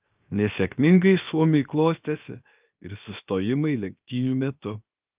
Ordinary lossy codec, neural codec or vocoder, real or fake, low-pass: Opus, 24 kbps; codec, 16 kHz, 0.7 kbps, FocalCodec; fake; 3.6 kHz